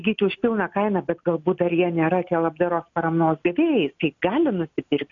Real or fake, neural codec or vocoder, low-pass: real; none; 7.2 kHz